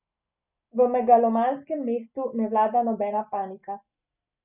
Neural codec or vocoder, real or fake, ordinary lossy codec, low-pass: none; real; none; 3.6 kHz